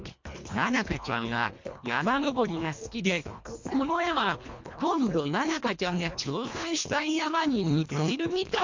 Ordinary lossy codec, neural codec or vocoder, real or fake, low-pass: MP3, 48 kbps; codec, 24 kHz, 1.5 kbps, HILCodec; fake; 7.2 kHz